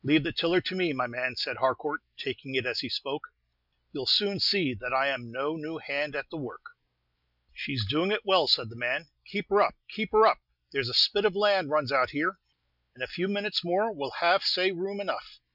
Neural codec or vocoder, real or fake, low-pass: none; real; 5.4 kHz